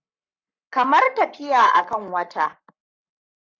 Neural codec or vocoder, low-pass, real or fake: codec, 44.1 kHz, 7.8 kbps, Pupu-Codec; 7.2 kHz; fake